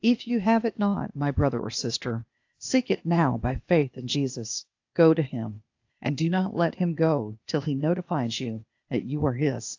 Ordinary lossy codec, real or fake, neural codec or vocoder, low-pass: AAC, 48 kbps; fake; codec, 16 kHz, 0.8 kbps, ZipCodec; 7.2 kHz